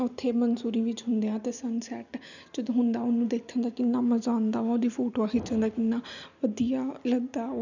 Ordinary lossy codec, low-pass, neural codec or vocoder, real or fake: none; 7.2 kHz; none; real